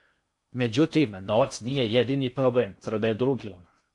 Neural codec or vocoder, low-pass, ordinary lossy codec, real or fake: codec, 16 kHz in and 24 kHz out, 0.6 kbps, FocalCodec, streaming, 4096 codes; 10.8 kHz; AAC, 64 kbps; fake